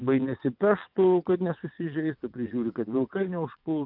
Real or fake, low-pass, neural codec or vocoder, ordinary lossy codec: fake; 5.4 kHz; vocoder, 22.05 kHz, 80 mel bands, WaveNeXt; AAC, 48 kbps